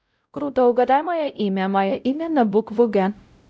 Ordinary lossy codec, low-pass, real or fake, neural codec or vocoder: none; none; fake; codec, 16 kHz, 0.5 kbps, X-Codec, WavLM features, trained on Multilingual LibriSpeech